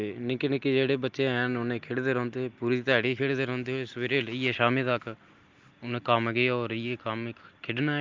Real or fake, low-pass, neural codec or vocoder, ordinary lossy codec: real; 7.2 kHz; none; Opus, 24 kbps